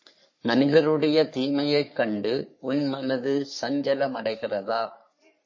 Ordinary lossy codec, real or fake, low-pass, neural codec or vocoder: MP3, 32 kbps; fake; 7.2 kHz; codec, 44.1 kHz, 3.4 kbps, Pupu-Codec